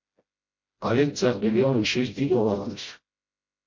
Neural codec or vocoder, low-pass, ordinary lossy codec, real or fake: codec, 16 kHz, 0.5 kbps, FreqCodec, smaller model; 7.2 kHz; MP3, 48 kbps; fake